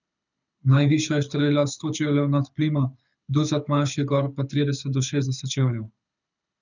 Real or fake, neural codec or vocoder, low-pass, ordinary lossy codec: fake; codec, 24 kHz, 6 kbps, HILCodec; 7.2 kHz; none